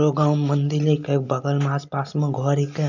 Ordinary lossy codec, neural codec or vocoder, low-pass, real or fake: none; none; 7.2 kHz; real